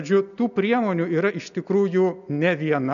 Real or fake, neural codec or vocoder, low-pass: real; none; 7.2 kHz